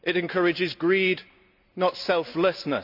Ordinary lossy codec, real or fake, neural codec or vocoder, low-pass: none; real; none; 5.4 kHz